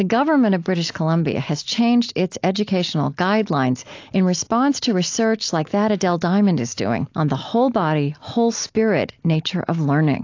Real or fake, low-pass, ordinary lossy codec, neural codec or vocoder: real; 7.2 kHz; AAC, 48 kbps; none